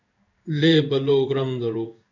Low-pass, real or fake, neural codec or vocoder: 7.2 kHz; fake; codec, 16 kHz in and 24 kHz out, 1 kbps, XY-Tokenizer